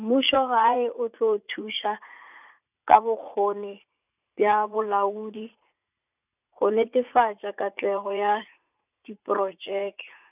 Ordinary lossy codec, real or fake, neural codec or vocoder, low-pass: none; fake; vocoder, 44.1 kHz, 80 mel bands, Vocos; 3.6 kHz